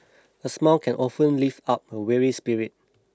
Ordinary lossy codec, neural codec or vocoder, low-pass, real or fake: none; none; none; real